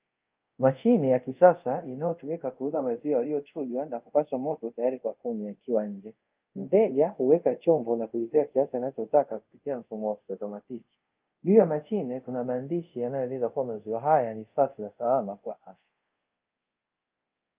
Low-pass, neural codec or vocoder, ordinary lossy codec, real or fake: 3.6 kHz; codec, 24 kHz, 0.5 kbps, DualCodec; Opus, 24 kbps; fake